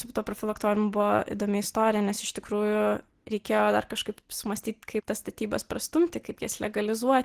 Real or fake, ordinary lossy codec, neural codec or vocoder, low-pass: real; Opus, 16 kbps; none; 14.4 kHz